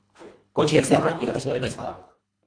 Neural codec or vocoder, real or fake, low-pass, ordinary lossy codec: codec, 24 kHz, 1.5 kbps, HILCodec; fake; 9.9 kHz; AAC, 48 kbps